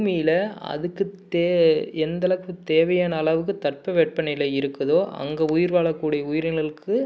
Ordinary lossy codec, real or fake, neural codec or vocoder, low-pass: none; real; none; none